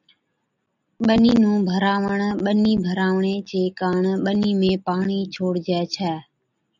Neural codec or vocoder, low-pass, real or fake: none; 7.2 kHz; real